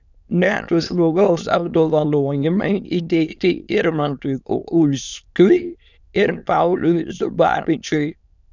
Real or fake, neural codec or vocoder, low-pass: fake; autoencoder, 22.05 kHz, a latent of 192 numbers a frame, VITS, trained on many speakers; 7.2 kHz